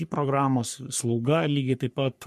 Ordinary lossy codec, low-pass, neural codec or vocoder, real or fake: MP3, 64 kbps; 14.4 kHz; codec, 44.1 kHz, 3.4 kbps, Pupu-Codec; fake